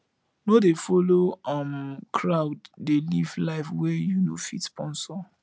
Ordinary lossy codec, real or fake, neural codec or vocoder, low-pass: none; real; none; none